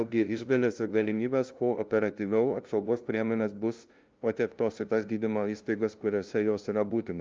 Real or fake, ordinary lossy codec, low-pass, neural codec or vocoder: fake; Opus, 24 kbps; 7.2 kHz; codec, 16 kHz, 0.5 kbps, FunCodec, trained on LibriTTS, 25 frames a second